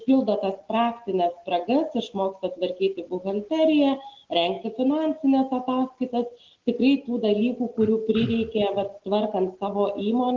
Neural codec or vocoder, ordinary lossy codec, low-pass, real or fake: none; Opus, 16 kbps; 7.2 kHz; real